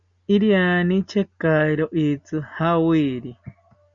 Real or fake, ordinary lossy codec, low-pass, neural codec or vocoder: real; Opus, 64 kbps; 7.2 kHz; none